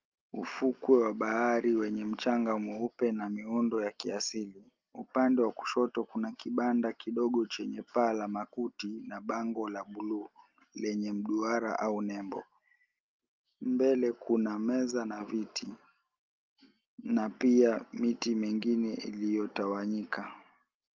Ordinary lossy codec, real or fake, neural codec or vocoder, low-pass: Opus, 24 kbps; real; none; 7.2 kHz